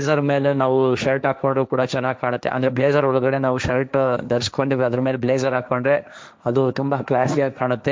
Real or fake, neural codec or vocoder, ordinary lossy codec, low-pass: fake; codec, 16 kHz, 1.1 kbps, Voila-Tokenizer; none; none